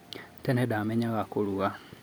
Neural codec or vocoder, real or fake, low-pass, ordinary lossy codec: none; real; none; none